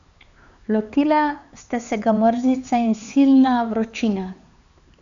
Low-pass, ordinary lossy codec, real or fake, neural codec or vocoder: 7.2 kHz; AAC, 96 kbps; fake; codec, 16 kHz, 4 kbps, X-Codec, HuBERT features, trained on LibriSpeech